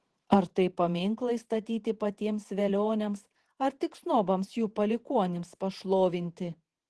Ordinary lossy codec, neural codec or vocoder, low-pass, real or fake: Opus, 16 kbps; vocoder, 48 kHz, 128 mel bands, Vocos; 10.8 kHz; fake